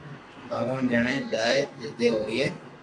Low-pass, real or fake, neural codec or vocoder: 9.9 kHz; fake; codec, 32 kHz, 1.9 kbps, SNAC